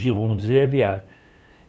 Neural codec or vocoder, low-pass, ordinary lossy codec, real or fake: codec, 16 kHz, 2 kbps, FunCodec, trained on LibriTTS, 25 frames a second; none; none; fake